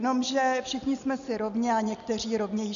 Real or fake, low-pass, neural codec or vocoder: real; 7.2 kHz; none